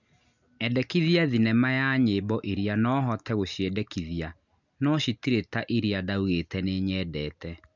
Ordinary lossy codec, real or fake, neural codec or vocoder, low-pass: none; real; none; 7.2 kHz